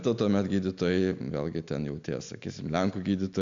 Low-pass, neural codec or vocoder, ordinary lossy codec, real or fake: 7.2 kHz; none; MP3, 64 kbps; real